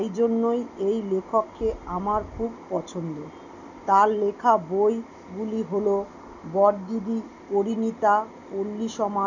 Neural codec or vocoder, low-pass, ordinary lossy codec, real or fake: none; 7.2 kHz; none; real